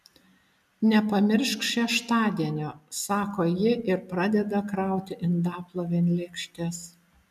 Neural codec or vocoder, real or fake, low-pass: none; real; 14.4 kHz